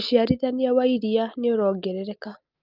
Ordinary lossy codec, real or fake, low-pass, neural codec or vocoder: Opus, 32 kbps; real; 5.4 kHz; none